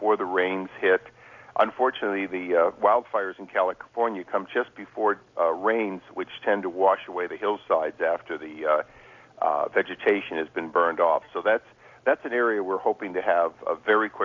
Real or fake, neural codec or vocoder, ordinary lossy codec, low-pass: real; none; MP3, 64 kbps; 7.2 kHz